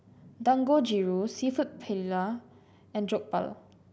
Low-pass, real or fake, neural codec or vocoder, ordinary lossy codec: none; real; none; none